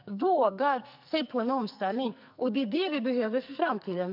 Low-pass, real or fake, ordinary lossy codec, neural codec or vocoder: 5.4 kHz; fake; none; codec, 44.1 kHz, 2.6 kbps, SNAC